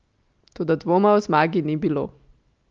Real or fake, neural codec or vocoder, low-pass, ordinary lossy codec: real; none; 7.2 kHz; Opus, 24 kbps